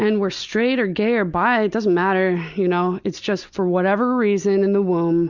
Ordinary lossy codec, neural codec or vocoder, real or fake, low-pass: Opus, 64 kbps; none; real; 7.2 kHz